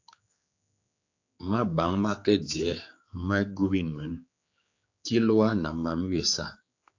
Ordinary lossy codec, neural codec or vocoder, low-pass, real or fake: AAC, 48 kbps; codec, 16 kHz, 4 kbps, X-Codec, HuBERT features, trained on general audio; 7.2 kHz; fake